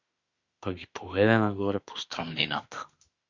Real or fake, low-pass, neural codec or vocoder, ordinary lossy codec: fake; 7.2 kHz; autoencoder, 48 kHz, 32 numbers a frame, DAC-VAE, trained on Japanese speech; Opus, 64 kbps